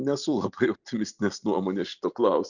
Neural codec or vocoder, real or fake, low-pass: none; real; 7.2 kHz